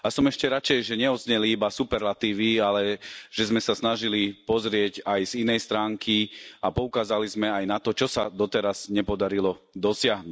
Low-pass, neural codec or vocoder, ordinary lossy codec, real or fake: none; none; none; real